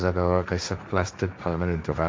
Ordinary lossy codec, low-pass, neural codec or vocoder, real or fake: none; none; codec, 16 kHz, 1.1 kbps, Voila-Tokenizer; fake